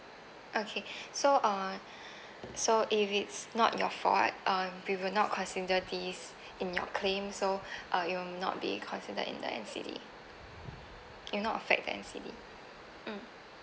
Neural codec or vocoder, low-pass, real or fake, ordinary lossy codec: none; none; real; none